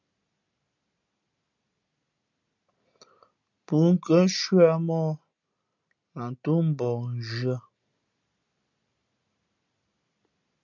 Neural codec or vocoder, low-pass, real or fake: none; 7.2 kHz; real